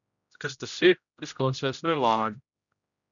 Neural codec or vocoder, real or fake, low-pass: codec, 16 kHz, 0.5 kbps, X-Codec, HuBERT features, trained on general audio; fake; 7.2 kHz